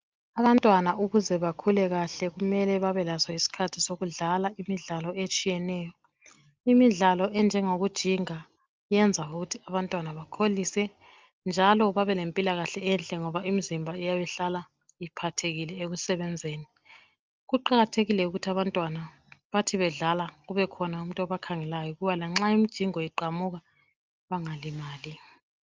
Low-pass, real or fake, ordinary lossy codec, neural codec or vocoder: 7.2 kHz; real; Opus, 24 kbps; none